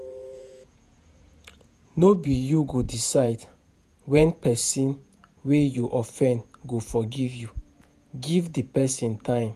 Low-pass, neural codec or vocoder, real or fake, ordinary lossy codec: 14.4 kHz; vocoder, 48 kHz, 128 mel bands, Vocos; fake; none